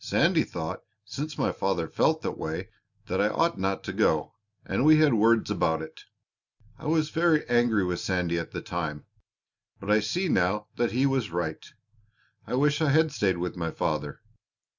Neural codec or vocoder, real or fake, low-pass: none; real; 7.2 kHz